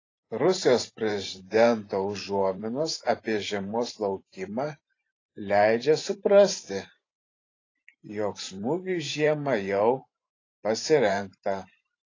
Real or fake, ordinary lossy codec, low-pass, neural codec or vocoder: fake; AAC, 32 kbps; 7.2 kHz; vocoder, 44.1 kHz, 128 mel bands every 512 samples, BigVGAN v2